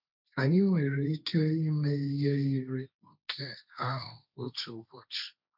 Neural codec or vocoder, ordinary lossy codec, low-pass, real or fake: codec, 16 kHz, 1.1 kbps, Voila-Tokenizer; none; 5.4 kHz; fake